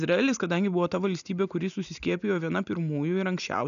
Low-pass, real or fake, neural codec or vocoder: 7.2 kHz; real; none